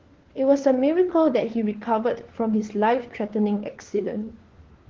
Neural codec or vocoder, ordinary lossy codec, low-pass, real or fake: codec, 16 kHz, 4 kbps, FunCodec, trained on LibriTTS, 50 frames a second; Opus, 16 kbps; 7.2 kHz; fake